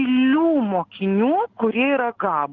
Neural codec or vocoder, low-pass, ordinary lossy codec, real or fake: none; 7.2 kHz; Opus, 16 kbps; real